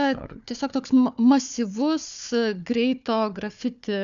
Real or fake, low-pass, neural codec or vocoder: fake; 7.2 kHz; codec, 16 kHz, 4 kbps, FunCodec, trained on Chinese and English, 50 frames a second